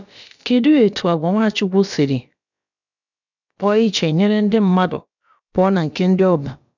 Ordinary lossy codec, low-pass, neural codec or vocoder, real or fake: none; 7.2 kHz; codec, 16 kHz, about 1 kbps, DyCAST, with the encoder's durations; fake